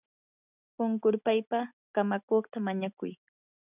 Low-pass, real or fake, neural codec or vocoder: 3.6 kHz; real; none